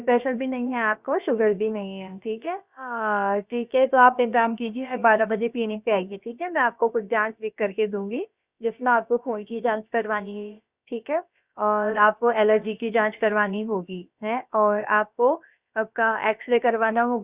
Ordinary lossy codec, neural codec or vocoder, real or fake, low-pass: Opus, 64 kbps; codec, 16 kHz, about 1 kbps, DyCAST, with the encoder's durations; fake; 3.6 kHz